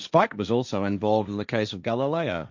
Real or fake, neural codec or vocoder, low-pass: fake; codec, 16 kHz, 1.1 kbps, Voila-Tokenizer; 7.2 kHz